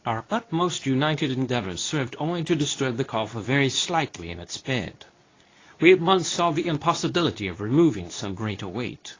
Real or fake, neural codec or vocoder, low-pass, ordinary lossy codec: fake; codec, 24 kHz, 0.9 kbps, WavTokenizer, medium speech release version 2; 7.2 kHz; AAC, 32 kbps